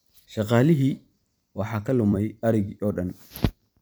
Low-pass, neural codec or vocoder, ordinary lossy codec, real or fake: none; vocoder, 44.1 kHz, 128 mel bands every 256 samples, BigVGAN v2; none; fake